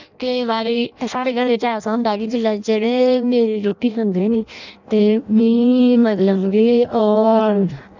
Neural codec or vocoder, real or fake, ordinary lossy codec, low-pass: codec, 16 kHz in and 24 kHz out, 0.6 kbps, FireRedTTS-2 codec; fake; none; 7.2 kHz